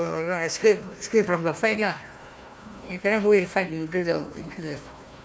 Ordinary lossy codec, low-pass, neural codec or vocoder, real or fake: none; none; codec, 16 kHz, 1 kbps, FunCodec, trained on Chinese and English, 50 frames a second; fake